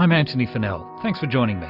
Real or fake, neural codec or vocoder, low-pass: real; none; 5.4 kHz